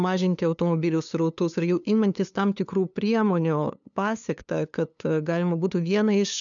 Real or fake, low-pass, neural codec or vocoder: fake; 7.2 kHz; codec, 16 kHz, 2 kbps, FunCodec, trained on LibriTTS, 25 frames a second